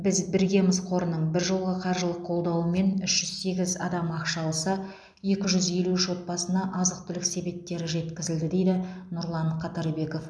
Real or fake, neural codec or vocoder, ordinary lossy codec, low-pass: real; none; none; none